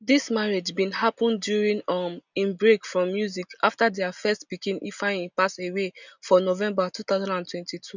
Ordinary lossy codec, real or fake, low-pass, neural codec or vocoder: none; real; 7.2 kHz; none